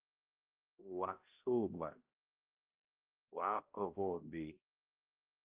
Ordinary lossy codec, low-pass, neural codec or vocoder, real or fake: Opus, 24 kbps; 3.6 kHz; codec, 16 kHz, 0.5 kbps, X-Codec, HuBERT features, trained on balanced general audio; fake